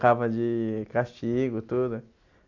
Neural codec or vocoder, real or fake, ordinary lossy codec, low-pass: none; real; AAC, 48 kbps; 7.2 kHz